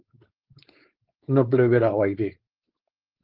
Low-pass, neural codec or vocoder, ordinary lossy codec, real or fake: 5.4 kHz; codec, 16 kHz, 4.8 kbps, FACodec; Opus, 16 kbps; fake